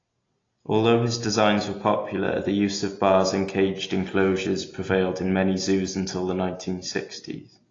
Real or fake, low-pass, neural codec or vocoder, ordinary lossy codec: real; 7.2 kHz; none; AAC, 32 kbps